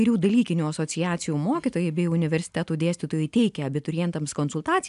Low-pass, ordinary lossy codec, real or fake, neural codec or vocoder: 10.8 kHz; AAC, 96 kbps; real; none